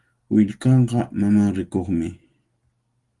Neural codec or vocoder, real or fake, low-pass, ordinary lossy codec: none; real; 10.8 kHz; Opus, 24 kbps